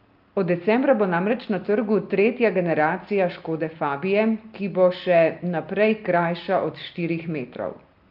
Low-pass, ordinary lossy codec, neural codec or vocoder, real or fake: 5.4 kHz; Opus, 24 kbps; none; real